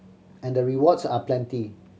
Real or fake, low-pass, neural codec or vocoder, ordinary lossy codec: real; none; none; none